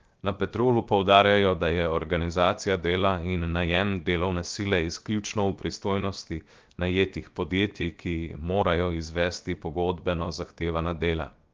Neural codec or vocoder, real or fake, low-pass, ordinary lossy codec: codec, 16 kHz, 0.7 kbps, FocalCodec; fake; 7.2 kHz; Opus, 24 kbps